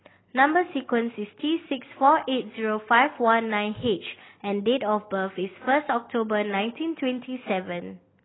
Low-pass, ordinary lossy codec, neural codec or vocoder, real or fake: 7.2 kHz; AAC, 16 kbps; none; real